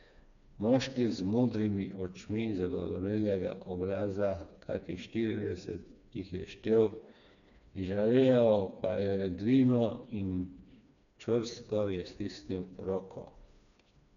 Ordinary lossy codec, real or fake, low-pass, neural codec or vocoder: none; fake; 7.2 kHz; codec, 16 kHz, 2 kbps, FreqCodec, smaller model